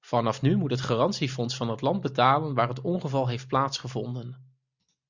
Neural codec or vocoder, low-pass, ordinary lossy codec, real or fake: none; 7.2 kHz; Opus, 64 kbps; real